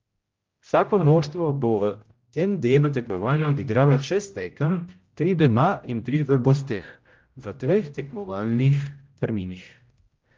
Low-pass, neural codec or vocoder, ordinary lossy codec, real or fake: 7.2 kHz; codec, 16 kHz, 0.5 kbps, X-Codec, HuBERT features, trained on general audio; Opus, 32 kbps; fake